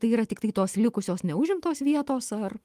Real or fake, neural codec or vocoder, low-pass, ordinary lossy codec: fake; autoencoder, 48 kHz, 128 numbers a frame, DAC-VAE, trained on Japanese speech; 14.4 kHz; Opus, 24 kbps